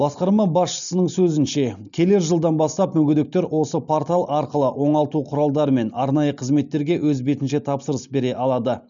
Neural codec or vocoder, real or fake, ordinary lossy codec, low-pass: none; real; none; 7.2 kHz